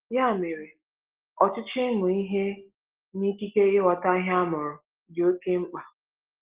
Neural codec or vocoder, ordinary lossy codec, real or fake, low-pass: none; Opus, 16 kbps; real; 3.6 kHz